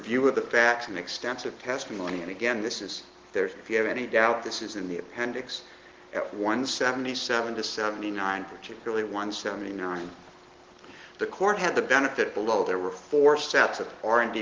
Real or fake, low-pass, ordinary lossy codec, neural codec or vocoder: real; 7.2 kHz; Opus, 16 kbps; none